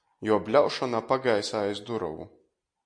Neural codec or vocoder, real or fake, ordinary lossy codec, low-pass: none; real; MP3, 48 kbps; 9.9 kHz